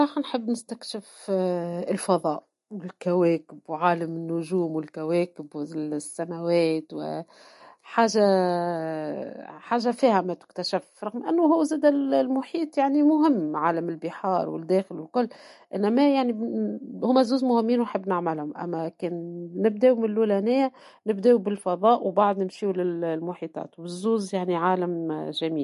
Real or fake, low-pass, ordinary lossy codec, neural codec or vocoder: real; 14.4 kHz; MP3, 48 kbps; none